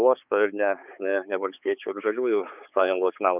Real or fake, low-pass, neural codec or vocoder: fake; 3.6 kHz; codec, 16 kHz, 4 kbps, X-Codec, HuBERT features, trained on balanced general audio